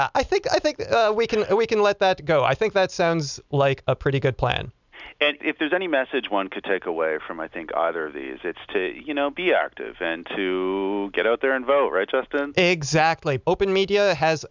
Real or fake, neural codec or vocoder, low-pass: real; none; 7.2 kHz